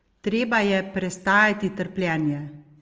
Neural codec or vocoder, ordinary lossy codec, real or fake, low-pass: none; Opus, 24 kbps; real; 7.2 kHz